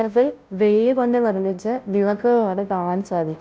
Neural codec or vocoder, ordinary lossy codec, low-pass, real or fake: codec, 16 kHz, 0.5 kbps, FunCodec, trained on Chinese and English, 25 frames a second; none; none; fake